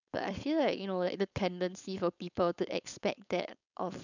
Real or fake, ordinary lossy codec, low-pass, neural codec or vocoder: fake; none; 7.2 kHz; codec, 16 kHz, 4.8 kbps, FACodec